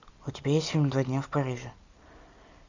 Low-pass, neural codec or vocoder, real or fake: 7.2 kHz; none; real